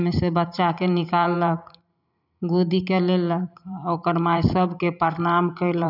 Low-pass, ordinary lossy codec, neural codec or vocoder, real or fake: 5.4 kHz; none; vocoder, 44.1 kHz, 128 mel bands every 512 samples, BigVGAN v2; fake